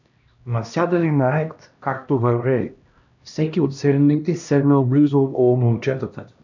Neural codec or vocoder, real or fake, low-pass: codec, 16 kHz, 1 kbps, X-Codec, HuBERT features, trained on LibriSpeech; fake; 7.2 kHz